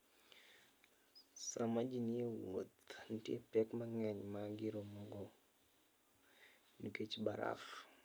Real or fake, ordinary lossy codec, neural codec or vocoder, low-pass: real; none; none; none